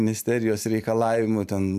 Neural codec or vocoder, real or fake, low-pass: vocoder, 44.1 kHz, 128 mel bands every 256 samples, BigVGAN v2; fake; 14.4 kHz